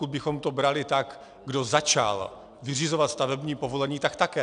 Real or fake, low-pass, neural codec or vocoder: real; 9.9 kHz; none